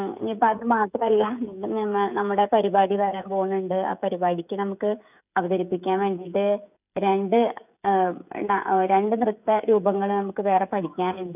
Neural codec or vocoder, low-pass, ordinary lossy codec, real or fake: codec, 16 kHz, 16 kbps, FreqCodec, smaller model; 3.6 kHz; none; fake